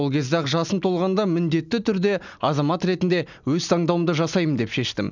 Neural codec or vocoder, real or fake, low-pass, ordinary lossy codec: none; real; 7.2 kHz; none